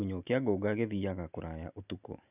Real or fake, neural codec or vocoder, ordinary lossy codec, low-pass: real; none; none; 3.6 kHz